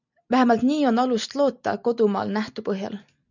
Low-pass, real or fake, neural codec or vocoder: 7.2 kHz; real; none